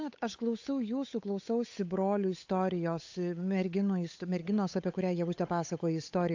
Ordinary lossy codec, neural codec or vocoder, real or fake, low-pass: AAC, 48 kbps; codec, 16 kHz, 8 kbps, FunCodec, trained on Chinese and English, 25 frames a second; fake; 7.2 kHz